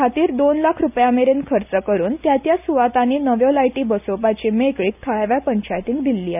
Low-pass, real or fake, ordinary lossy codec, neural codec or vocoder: 3.6 kHz; real; none; none